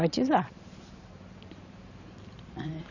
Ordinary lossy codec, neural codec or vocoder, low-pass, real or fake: none; vocoder, 44.1 kHz, 128 mel bands every 512 samples, BigVGAN v2; 7.2 kHz; fake